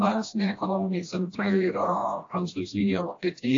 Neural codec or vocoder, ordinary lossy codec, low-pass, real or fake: codec, 16 kHz, 1 kbps, FreqCodec, smaller model; AAC, 48 kbps; 7.2 kHz; fake